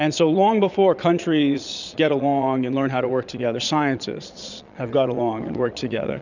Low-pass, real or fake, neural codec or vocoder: 7.2 kHz; fake; vocoder, 22.05 kHz, 80 mel bands, Vocos